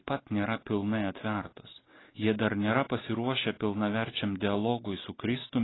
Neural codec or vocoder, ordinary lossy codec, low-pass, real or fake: none; AAC, 16 kbps; 7.2 kHz; real